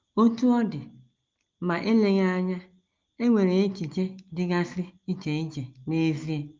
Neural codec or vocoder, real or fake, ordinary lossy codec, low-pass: none; real; Opus, 32 kbps; 7.2 kHz